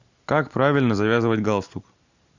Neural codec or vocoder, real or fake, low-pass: none; real; 7.2 kHz